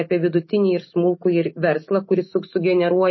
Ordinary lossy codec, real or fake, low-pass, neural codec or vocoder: MP3, 24 kbps; real; 7.2 kHz; none